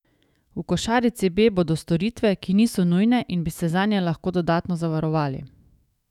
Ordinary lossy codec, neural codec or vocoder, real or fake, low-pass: none; autoencoder, 48 kHz, 128 numbers a frame, DAC-VAE, trained on Japanese speech; fake; 19.8 kHz